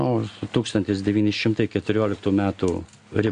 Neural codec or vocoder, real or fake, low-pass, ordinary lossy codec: none; real; 9.9 kHz; AAC, 64 kbps